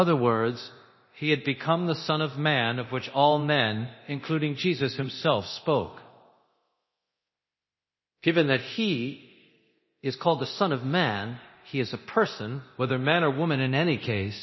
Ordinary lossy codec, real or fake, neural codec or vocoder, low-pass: MP3, 24 kbps; fake; codec, 24 kHz, 0.9 kbps, DualCodec; 7.2 kHz